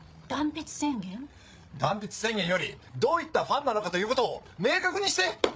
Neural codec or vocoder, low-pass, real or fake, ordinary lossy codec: codec, 16 kHz, 8 kbps, FreqCodec, larger model; none; fake; none